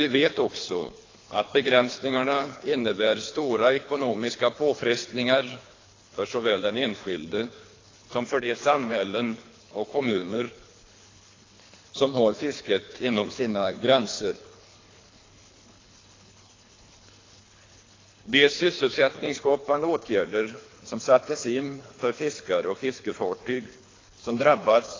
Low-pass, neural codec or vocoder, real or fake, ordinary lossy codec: 7.2 kHz; codec, 24 kHz, 3 kbps, HILCodec; fake; AAC, 32 kbps